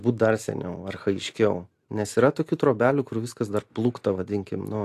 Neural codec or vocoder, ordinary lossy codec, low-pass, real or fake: none; AAC, 64 kbps; 14.4 kHz; real